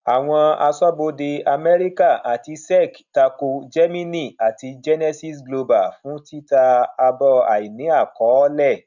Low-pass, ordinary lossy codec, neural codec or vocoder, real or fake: 7.2 kHz; none; none; real